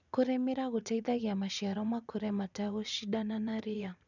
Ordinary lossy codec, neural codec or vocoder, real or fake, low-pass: none; vocoder, 44.1 kHz, 80 mel bands, Vocos; fake; 7.2 kHz